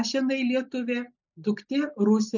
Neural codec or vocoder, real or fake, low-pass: none; real; 7.2 kHz